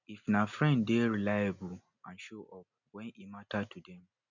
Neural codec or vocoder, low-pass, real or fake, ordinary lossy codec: none; 7.2 kHz; real; none